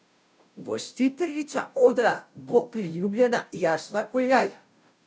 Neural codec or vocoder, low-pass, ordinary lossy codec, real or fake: codec, 16 kHz, 0.5 kbps, FunCodec, trained on Chinese and English, 25 frames a second; none; none; fake